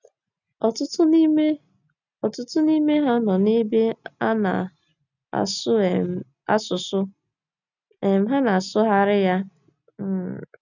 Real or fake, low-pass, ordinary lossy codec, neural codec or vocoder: real; 7.2 kHz; none; none